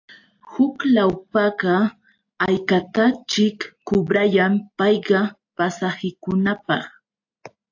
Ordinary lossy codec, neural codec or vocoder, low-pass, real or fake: AAC, 48 kbps; none; 7.2 kHz; real